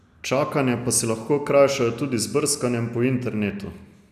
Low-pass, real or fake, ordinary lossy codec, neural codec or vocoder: 14.4 kHz; real; none; none